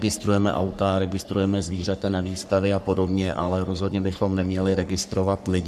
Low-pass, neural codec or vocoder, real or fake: 14.4 kHz; codec, 44.1 kHz, 3.4 kbps, Pupu-Codec; fake